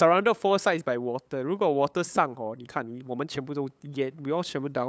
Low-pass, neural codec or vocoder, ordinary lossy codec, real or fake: none; codec, 16 kHz, 8 kbps, FunCodec, trained on LibriTTS, 25 frames a second; none; fake